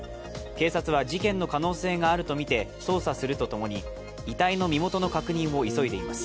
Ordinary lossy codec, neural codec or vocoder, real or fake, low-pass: none; none; real; none